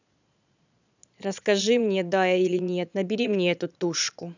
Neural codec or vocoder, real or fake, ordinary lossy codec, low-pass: vocoder, 22.05 kHz, 80 mel bands, Vocos; fake; none; 7.2 kHz